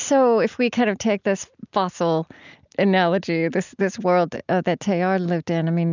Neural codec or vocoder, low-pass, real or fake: none; 7.2 kHz; real